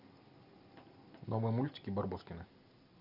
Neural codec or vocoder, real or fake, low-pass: none; real; 5.4 kHz